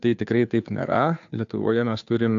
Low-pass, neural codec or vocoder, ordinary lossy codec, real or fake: 7.2 kHz; codec, 16 kHz, 2 kbps, FunCodec, trained on Chinese and English, 25 frames a second; AAC, 64 kbps; fake